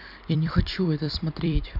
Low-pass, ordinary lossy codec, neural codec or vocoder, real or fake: 5.4 kHz; none; vocoder, 44.1 kHz, 128 mel bands every 256 samples, BigVGAN v2; fake